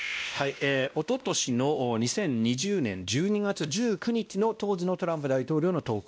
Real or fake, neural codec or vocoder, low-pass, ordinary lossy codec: fake; codec, 16 kHz, 1 kbps, X-Codec, WavLM features, trained on Multilingual LibriSpeech; none; none